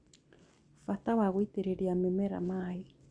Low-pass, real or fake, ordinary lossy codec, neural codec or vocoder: 9.9 kHz; real; Opus, 64 kbps; none